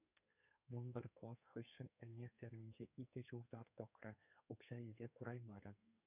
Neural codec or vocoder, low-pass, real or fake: codec, 32 kHz, 1.9 kbps, SNAC; 3.6 kHz; fake